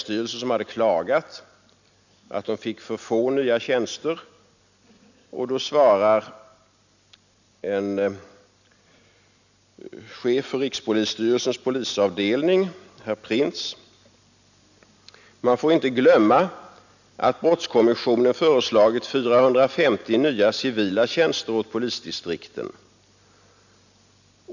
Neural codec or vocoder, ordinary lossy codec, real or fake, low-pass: none; none; real; 7.2 kHz